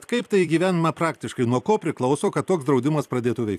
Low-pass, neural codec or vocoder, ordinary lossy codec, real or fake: 14.4 kHz; vocoder, 44.1 kHz, 128 mel bands every 256 samples, BigVGAN v2; AAC, 96 kbps; fake